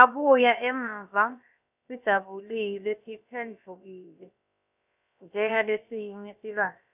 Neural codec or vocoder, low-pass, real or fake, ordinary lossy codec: codec, 16 kHz, about 1 kbps, DyCAST, with the encoder's durations; 3.6 kHz; fake; none